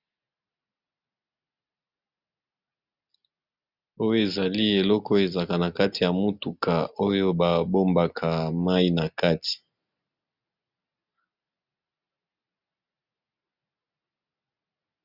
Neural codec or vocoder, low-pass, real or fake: none; 5.4 kHz; real